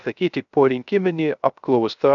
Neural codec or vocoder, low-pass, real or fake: codec, 16 kHz, 0.3 kbps, FocalCodec; 7.2 kHz; fake